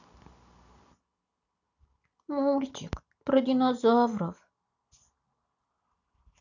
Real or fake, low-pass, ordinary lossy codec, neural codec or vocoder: real; 7.2 kHz; none; none